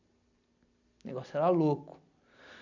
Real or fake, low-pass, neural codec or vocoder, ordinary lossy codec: fake; 7.2 kHz; vocoder, 44.1 kHz, 128 mel bands every 256 samples, BigVGAN v2; none